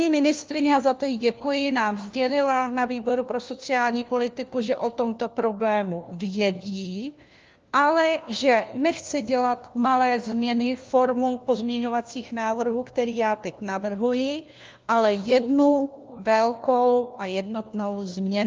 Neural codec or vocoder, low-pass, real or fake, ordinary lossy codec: codec, 16 kHz, 1 kbps, FunCodec, trained on LibriTTS, 50 frames a second; 7.2 kHz; fake; Opus, 24 kbps